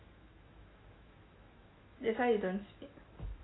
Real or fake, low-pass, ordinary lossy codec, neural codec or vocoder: fake; 7.2 kHz; AAC, 16 kbps; autoencoder, 48 kHz, 128 numbers a frame, DAC-VAE, trained on Japanese speech